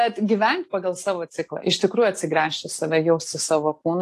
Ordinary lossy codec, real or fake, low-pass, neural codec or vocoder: AAC, 48 kbps; real; 14.4 kHz; none